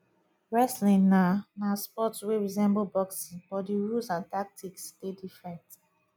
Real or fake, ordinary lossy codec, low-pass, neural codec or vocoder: real; none; 19.8 kHz; none